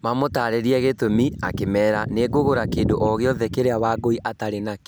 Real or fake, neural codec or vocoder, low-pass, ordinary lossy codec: real; none; none; none